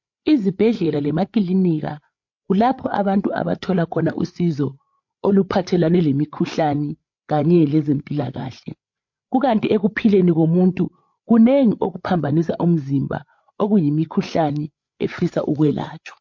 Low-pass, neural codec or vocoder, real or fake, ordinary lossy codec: 7.2 kHz; codec, 16 kHz, 8 kbps, FreqCodec, larger model; fake; MP3, 48 kbps